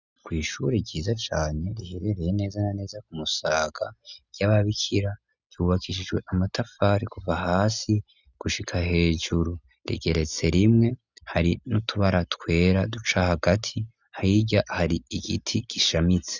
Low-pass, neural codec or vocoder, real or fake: 7.2 kHz; none; real